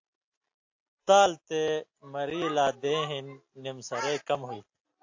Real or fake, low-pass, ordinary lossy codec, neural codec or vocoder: real; 7.2 kHz; AAC, 48 kbps; none